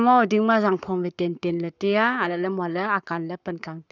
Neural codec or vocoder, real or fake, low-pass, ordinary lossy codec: codec, 44.1 kHz, 7.8 kbps, Pupu-Codec; fake; 7.2 kHz; none